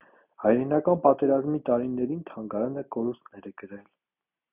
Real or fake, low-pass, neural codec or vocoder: real; 3.6 kHz; none